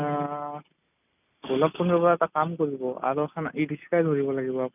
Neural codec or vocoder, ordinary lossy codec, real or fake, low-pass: none; none; real; 3.6 kHz